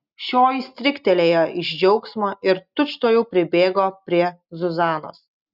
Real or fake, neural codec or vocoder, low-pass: real; none; 5.4 kHz